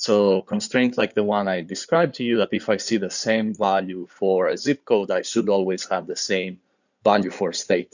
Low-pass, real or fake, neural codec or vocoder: 7.2 kHz; fake; codec, 16 kHz in and 24 kHz out, 2.2 kbps, FireRedTTS-2 codec